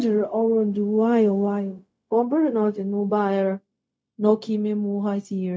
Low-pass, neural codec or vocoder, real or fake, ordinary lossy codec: none; codec, 16 kHz, 0.4 kbps, LongCat-Audio-Codec; fake; none